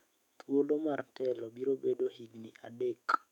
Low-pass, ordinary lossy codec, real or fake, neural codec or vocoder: 19.8 kHz; none; fake; autoencoder, 48 kHz, 128 numbers a frame, DAC-VAE, trained on Japanese speech